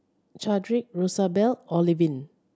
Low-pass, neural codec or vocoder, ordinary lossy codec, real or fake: none; none; none; real